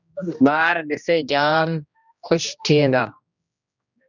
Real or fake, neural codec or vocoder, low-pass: fake; codec, 16 kHz, 1 kbps, X-Codec, HuBERT features, trained on general audio; 7.2 kHz